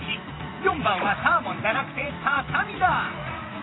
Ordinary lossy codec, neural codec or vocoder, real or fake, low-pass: AAC, 16 kbps; vocoder, 44.1 kHz, 80 mel bands, Vocos; fake; 7.2 kHz